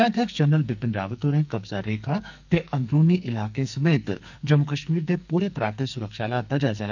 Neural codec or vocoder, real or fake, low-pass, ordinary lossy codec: codec, 44.1 kHz, 2.6 kbps, SNAC; fake; 7.2 kHz; none